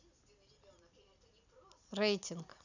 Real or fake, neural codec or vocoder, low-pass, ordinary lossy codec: real; none; 7.2 kHz; none